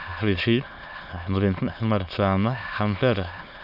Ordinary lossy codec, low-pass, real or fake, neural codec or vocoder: MP3, 48 kbps; 5.4 kHz; fake; autoencoder, 22.05 kHz, a latent of 192 numbers a frame, VITS, trained on many speakers